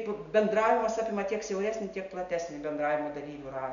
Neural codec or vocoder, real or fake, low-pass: none; real; 7.2 kHz